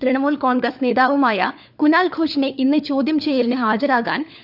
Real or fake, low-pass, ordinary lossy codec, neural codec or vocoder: fake; 5.4 kHz; none; codec, 16 kHz, 4 kbps, FunCodec, trained on LibriTTS, 50 frames a second